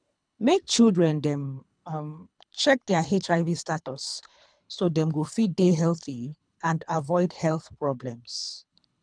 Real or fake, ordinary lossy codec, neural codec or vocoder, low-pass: fake; none; codec, 24 kHz, 3 kbps, HILCodec; 9.9 kHz